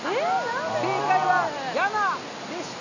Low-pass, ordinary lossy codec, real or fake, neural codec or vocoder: 7.2 kHz; none; real; none